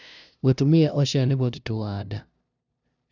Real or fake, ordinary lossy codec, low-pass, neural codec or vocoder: fake; none; 7.2 kHz; codec, 16 kHz, 0.5 kbps, FunCodec, trained on LibriTTS, 25 frames a second